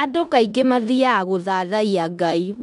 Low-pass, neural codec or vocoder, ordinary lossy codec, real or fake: 10.8 kHz; codec, 16 kHz in and 24 kHz out, 0.9 kbps, LongCat-Audio-Codec, four codebook decoder; none; fake